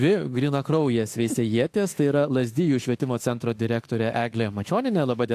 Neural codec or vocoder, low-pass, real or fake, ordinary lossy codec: autoencoder, 48 kHz, 32 numbers a frame, DAC-VAE, trained on Japanese speech; 14.4 kHz; fake; AAC, 64 kbps